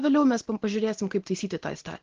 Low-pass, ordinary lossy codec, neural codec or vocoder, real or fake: 7.2 kHz; Opus, 16 kbps; none; real